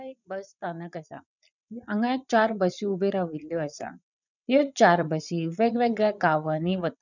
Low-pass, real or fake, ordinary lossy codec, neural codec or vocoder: 7.2 kHz; fake; none; vocoder, 22.05 kHz, 80 mel bands, Vocos